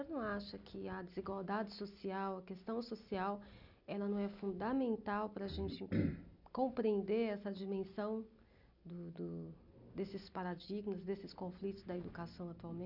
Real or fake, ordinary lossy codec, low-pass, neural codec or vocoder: real; none; 5.4 kHz; none